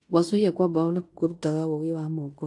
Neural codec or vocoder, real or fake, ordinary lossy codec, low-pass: codec, 16 kHz in and 24 kHz out, 0.9 kbps, LongCat-Audio-Codec, fine tuned four codebook decoder; fake; AAC, 48 kbps; 10.8 kHz